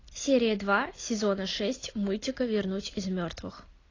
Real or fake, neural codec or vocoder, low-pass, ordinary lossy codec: real; none; 7.2 kHz; AAC, 32 kbps